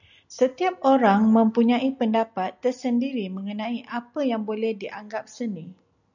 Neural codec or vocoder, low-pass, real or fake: none; 7.2 kHz; real